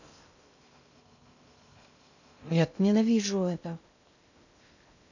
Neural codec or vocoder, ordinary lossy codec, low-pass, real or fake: codec, 16 kHz in and 24 kHz out, 0.6 kbps, FocalCodec, streaming, 2048 codes; none; 7.2 kHz; fake